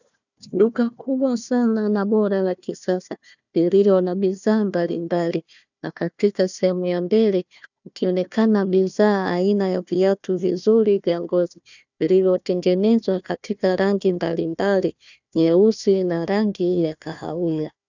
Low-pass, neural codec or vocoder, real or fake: 7.2 kHz; codec, 16 kHz, 1 kbps, FunCodec, trained on Chinese and English, 50 frames a second; fake